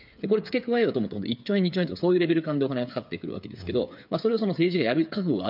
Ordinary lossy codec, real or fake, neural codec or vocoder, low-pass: none; fake; codec, 16 kHz, 8 kbps, FreqCodec, smaller model; 5.4 kHz